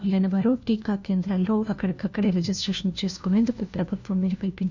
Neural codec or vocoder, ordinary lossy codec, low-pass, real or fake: codec, 16 kHz, 1 kbps, FunCodec, trained on LibriTTS, 50 frames a second; none; 7.2 kHz; fake